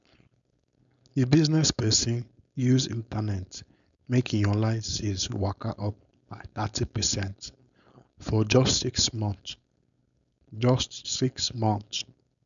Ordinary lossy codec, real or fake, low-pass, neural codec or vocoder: none; fake; 7.2 kHz; codec, 16 kHz, 4.8 kbps, FACodec